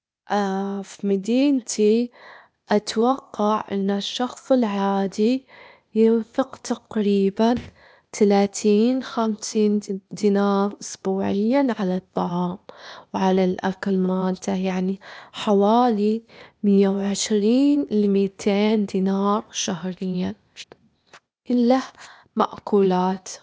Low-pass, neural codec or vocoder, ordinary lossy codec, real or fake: none; codec, 16 kHz, 0.8 kbps, ZipCodec; none; fake